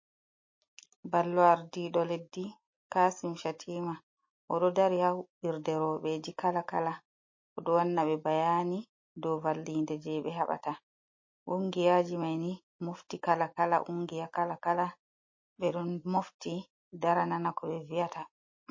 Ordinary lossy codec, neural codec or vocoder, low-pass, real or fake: MP3, 32 kbps; none; 7.2 kHz; real